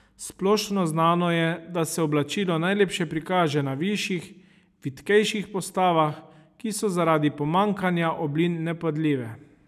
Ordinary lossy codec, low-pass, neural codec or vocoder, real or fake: none; 14.4 kHz; none; real